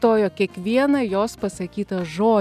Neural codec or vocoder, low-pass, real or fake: none; 14.4 kHz; real